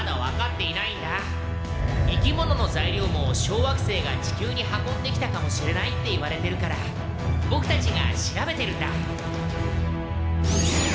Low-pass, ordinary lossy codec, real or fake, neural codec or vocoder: none; none; real; none